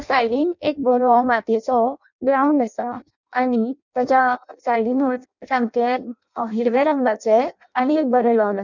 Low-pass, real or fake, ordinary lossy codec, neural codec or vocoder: 7.2 kHz; fake; none; codec, 16 kHz in and 24 kHz out, 0.6 kbps, FireRedTTS-2 codec